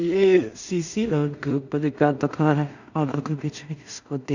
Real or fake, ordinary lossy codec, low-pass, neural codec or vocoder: fake; none; 7.2 kHz; codec, 16 kHz in and 24 kHz out, 0.4 kbps, LongCat-Audio-Codec, two codebook decoder